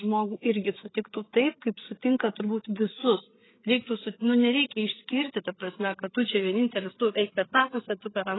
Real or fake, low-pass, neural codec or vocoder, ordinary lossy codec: fake; 7.2 kHz; codec, 16 kHz, 8 kbps, FreqCodec, larger model; AAC, 16 kbps